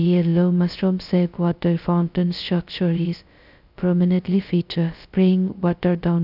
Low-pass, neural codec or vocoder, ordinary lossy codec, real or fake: 5.4 kHz; codec, 16 kHz, 0.2 kbps, FocalCodec; none; fake